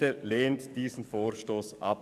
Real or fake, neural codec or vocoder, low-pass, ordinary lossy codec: fake; codec, 44.1 kHz, 7.8 kbps, DAC; 14.4 kHz; none